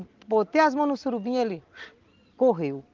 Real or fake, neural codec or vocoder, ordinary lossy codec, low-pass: real; none; Opus, 32 kbps; 7.2 kHz